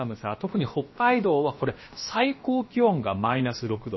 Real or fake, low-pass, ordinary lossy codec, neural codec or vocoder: fake; 7.2 kHz; MP3, 24 kbps; codec, 16 kHz, about 1 kbps, DyCAST, with the encoder's durations